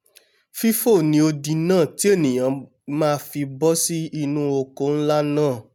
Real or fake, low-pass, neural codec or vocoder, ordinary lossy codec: real; none; none; none